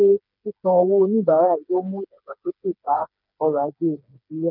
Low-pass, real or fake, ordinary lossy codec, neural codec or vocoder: 5.4 kHz; fake; none; codec, 16 kHz, 4 kbps, FreqCodec, smaller model